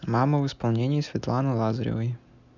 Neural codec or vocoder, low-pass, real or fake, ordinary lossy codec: none; 7.2 kHz; real; none